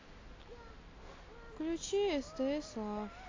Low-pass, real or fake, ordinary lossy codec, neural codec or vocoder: 7.2 kHz; real; AAC, 32 kbps; none